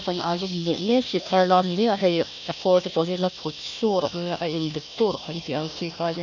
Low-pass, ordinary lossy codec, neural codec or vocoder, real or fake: 7.2 kHz; none; codec, 16 kHz, 1 kbps, FunCodec, trained on Chinese and English, 50 frames a second; fake